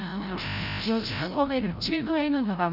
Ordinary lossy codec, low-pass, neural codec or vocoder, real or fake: none; 5.4 kHz; codec, 16 kHz, 0.5 kbps, FreqCodec, larger model; fake